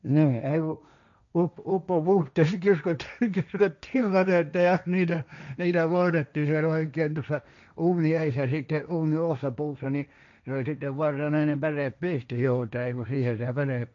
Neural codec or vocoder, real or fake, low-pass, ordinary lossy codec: codec, 16 kHz, 1.1 kbps, Voila-Tokenizer; fake; 7.2 kHz; none